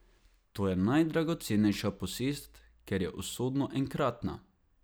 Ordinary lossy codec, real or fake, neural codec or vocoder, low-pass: none; real; none; none